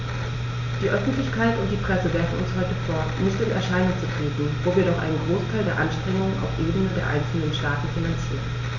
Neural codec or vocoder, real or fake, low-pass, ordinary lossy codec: none; real; 7.2 kHz; none